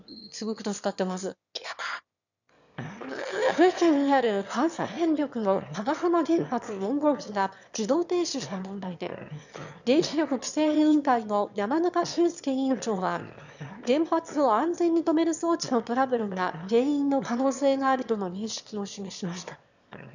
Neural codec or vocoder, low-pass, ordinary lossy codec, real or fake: autoencoder, 22.05 kHz, a latent of 192 numbers a frame, VITS, trained on one speaker; 7.2 kHz; none; fake